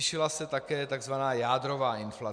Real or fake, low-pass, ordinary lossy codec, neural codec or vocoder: real; 9.9 kHz; MP3, 96 kbps; none